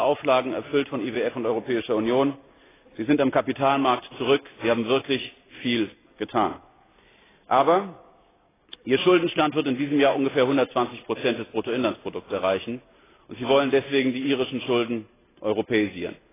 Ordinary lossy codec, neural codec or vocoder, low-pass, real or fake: AAC, 16 kbps; none; 3.6 kHz; real